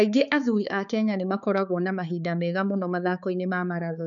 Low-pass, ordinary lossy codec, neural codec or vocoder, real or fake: 7.2 kHz; none; codec, 16 kHz, 4 kbps, X-Codec, HuBERT features, trained on balanced general audio; fake